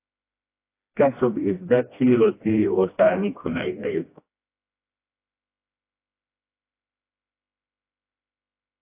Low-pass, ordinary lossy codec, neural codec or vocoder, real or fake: 3.6 kHz; AAC, 24 kbps; codec, 16 kHz, 1 kbps, FreqCodec, smaller model; fake